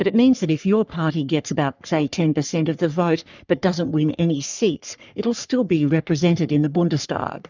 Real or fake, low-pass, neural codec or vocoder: fake; 7.2 kHz; codec, 44.1 kHz, 3.4 kbps, Pupu-Codec